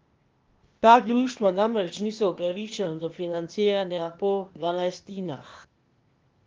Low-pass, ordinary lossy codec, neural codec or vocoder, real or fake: 7.2 kHz; Opus, 32 kbps; codec, 16 kHz, 0.8 kbps, ZipCodec; fake